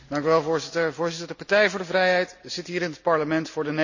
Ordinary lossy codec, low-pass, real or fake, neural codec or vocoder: none; 7.2 kHz; real; none